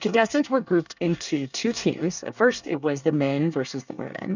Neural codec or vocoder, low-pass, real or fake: codec, 24 kHz, 1 kbps, SNAC; 7.2 kHz; fake